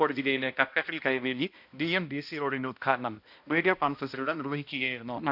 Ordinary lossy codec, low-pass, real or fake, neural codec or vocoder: none; 5.4 kHz; fake; codec, 16 kHz, 1 kbps, X-Codec, HuBERT features, trained on balanced general audio